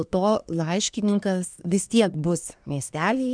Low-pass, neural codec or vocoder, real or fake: 9.9 kHz; codec, 24 kHz, 1 kbps, SNAC; fake